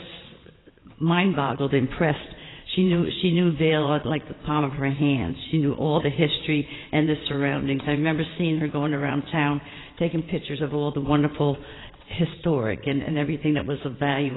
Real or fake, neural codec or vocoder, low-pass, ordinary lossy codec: fake; vocoder, 22.05 kHz, 80 mel bands, WaveNeXt; 7.2 kHz; AAC, 16 kbps